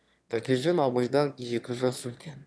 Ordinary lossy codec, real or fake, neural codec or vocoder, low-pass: none; fake; autoencoder, 22.05 kHz, a latent of 192 numbers a frame, VITS, trained on one speaker; none